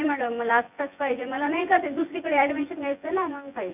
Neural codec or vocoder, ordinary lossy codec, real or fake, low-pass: vocoder, 24 kHz, 100 mel bands, Vocos; none; fake; 3.6 kHz